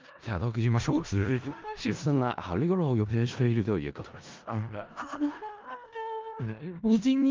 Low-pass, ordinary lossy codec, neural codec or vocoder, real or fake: 7.2 kHz; Opus, 24 kbps; codec, 16 kHz in and 24 kHz out, 0.4 kbps, LongCat-Audio-Codec, four codebook decoder; fake